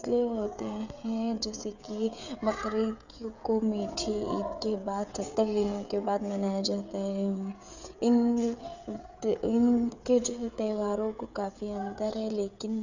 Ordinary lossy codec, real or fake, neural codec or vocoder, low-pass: none; fake; codec, 16 kHz, 16 kbps, FreqCodec, smaller model; 7.2 kHz